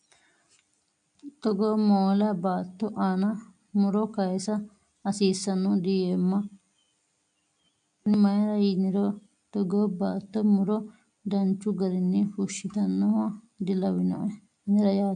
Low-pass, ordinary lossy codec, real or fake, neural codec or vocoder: 9.9 kHz; MP3, 64 kbps; real; none